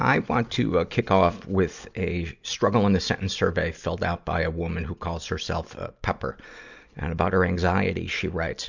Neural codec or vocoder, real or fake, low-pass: none; real; 7.2 kHz